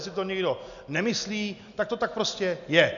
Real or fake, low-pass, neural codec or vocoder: real; 7.2 kHz; none